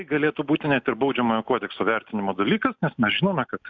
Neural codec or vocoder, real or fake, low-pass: none; real; 7.2 kHz